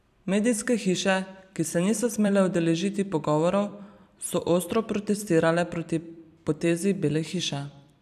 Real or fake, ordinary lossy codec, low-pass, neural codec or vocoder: fake; none; 14.4 kHz; vocoder, 44.1 kHz, 128 mel bands every 256 samples, BigVGAN v2